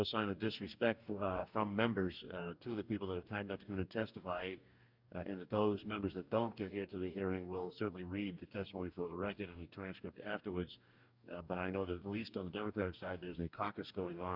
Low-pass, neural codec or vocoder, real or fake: 5.4 kHz; codec, 44.1 kHz, 2.6 kbps, DAC; fake